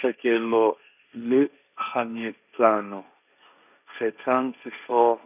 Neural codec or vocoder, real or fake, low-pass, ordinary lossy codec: codec, 16 kHz, 1.1 kbps, Voila-Tokenizer; fake; 3.6 kHz; none